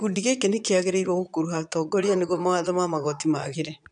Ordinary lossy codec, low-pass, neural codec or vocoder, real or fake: none; 9.9 kHz; vocoder, 22.05 kHz, 80 mel bands, Vocos; fake